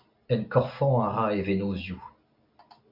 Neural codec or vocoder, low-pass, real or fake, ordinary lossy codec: none; 5.4 kHz; real; AAC, 48 kbps